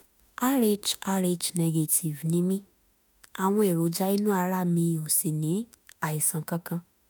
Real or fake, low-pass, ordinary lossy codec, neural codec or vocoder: fake; none; none; autoencoder, 48 kHz, 32 numbers a frame, DAC-VAE, trained on Japanese speech